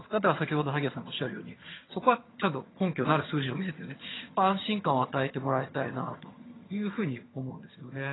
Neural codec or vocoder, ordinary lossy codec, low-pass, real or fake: vocoder, 22.05 kHz, 80 mel bands, HiFi-GAN; AAC, 16 kbps; 7.2 kHz; fake